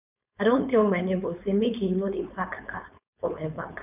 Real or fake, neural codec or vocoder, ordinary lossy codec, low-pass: fake; codec, 16 kHz, 4.8 kbps, FACodec; none; 3.6 kHz